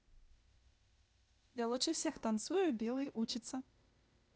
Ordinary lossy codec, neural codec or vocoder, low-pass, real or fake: none; codec, 16 kHz, 0.8 kbps, ZipCodec; none; fake